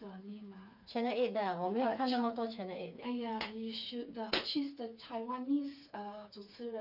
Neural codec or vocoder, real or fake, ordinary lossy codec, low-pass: codec, 16 kHz, 4 kbps, FreqCodec, smaller model; fake; none; 5.4 kHz